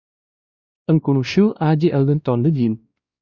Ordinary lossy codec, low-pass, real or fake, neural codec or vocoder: Opus, 64 kbps; 7.2 kHz; fake; codec, 16 kHz, 1 kbps, X-Codec, WavLM features, trained on Multilingual LibriSpeech